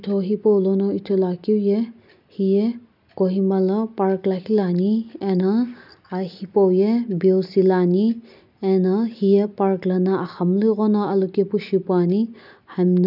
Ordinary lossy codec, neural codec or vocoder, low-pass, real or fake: none; none; 5.4 kHz; real